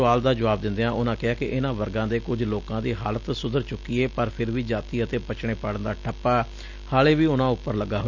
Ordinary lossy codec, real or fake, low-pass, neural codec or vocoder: none; real; 7.2 kHz; none